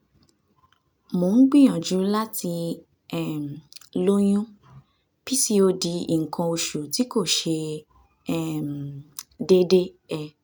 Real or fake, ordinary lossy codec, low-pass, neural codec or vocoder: real; none; none; none